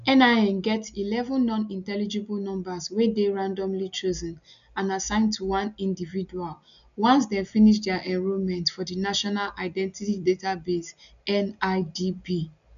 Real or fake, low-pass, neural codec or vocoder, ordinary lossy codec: real; 7.2 kHz; none; none